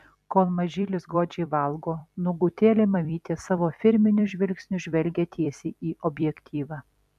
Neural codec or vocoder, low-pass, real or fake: vocoder, 44.1 kHz, 128 mel bands every 256 samples, BigVGAN v2; 14.4 kHz; fake